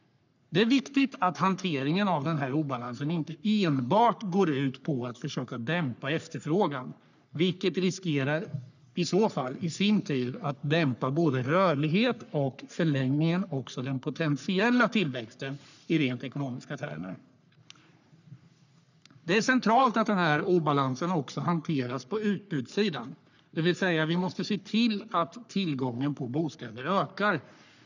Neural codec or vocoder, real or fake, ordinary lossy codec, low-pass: codec, 44.1 kHz, 3.4 kbps, Pupu-Codec; fake; none; 7.2 kHz